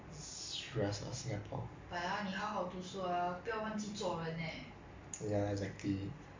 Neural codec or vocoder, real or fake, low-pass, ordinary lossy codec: none; real; 7.2 kHz; MP3, 48 kbps